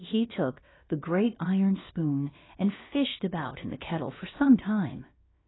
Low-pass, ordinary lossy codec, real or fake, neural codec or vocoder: 7.2 kHz; AAC, 16 kbps; fake; codec, 16 kHz, about 1 kbps, DyCAST, with the encoder's durations